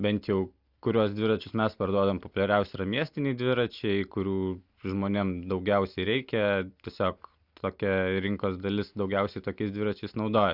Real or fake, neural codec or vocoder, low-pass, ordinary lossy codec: real; none; 5.4 kHz; Opus, 64 kbps